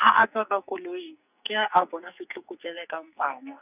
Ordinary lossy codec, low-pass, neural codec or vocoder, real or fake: none; 3.6 kHz; codec, 44.1 kHz, 2.6 kbps, SNAC; fake